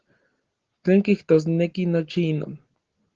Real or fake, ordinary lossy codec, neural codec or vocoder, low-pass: real; Opus, 16 kbps; none; 7.2 kHz